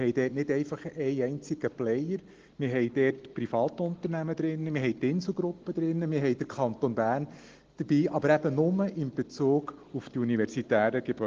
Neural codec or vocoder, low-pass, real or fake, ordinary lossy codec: none; 7.2 kHz; real; Opus, 16 kbps